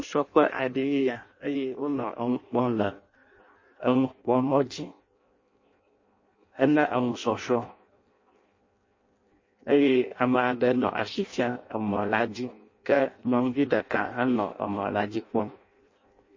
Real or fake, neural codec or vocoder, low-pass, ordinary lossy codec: fake; codec, 16 kHz in and 24 kHz out, 0.6 kbps, FireRedTTS-2 codec; 7.2 kHz; MP3, 32 kbps